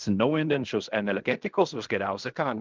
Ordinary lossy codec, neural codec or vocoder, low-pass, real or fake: Opus, 24 kbps; codec, 16 kHz in and 24 kHz out, 0.4 kbps, LongCat-Audio-Codec, fine tuned four codebook decoder; 7.2 kHz; fake